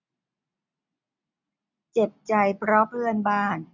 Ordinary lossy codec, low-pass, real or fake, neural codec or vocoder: none; 7.2 kHz; real; none